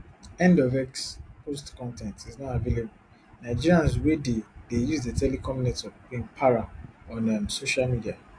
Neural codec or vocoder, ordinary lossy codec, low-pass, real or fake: none; AAC, 48 kbps; 9.9 kHz; real